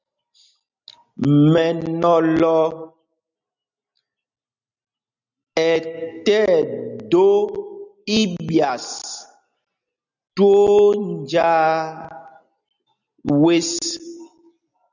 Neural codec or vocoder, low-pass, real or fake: none; 7.2 kHz; real